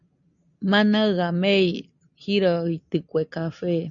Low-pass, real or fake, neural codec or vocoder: 7.2 kHz; real; none